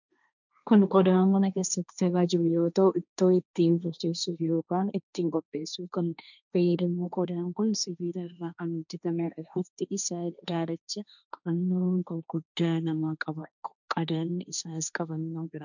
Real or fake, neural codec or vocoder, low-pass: fake; codec, 16 kHz, 1.1 kbps, Voila-Tokenizer; 7.2 kHz